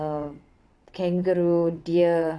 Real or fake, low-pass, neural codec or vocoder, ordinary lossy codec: fake; none; vocoder, 22.05 kHz, 80 mel bands, WaveNeXt; none